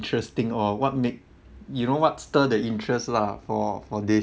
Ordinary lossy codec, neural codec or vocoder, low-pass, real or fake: none; none; none; real